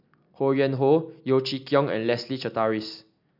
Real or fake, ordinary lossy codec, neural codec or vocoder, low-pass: real; none; none; 5.4 kHz